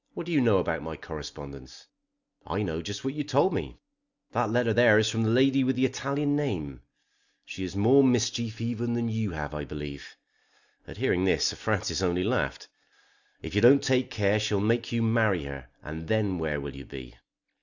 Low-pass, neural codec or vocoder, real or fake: 7.2 kHz; none; real